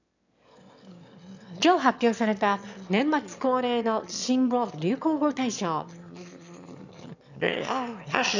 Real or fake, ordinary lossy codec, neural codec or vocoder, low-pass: fake; none; autoencoder, 22.05 kHz, a latent of 192 numbers a frame, VITS, trained on one speaker; 7.2 kHz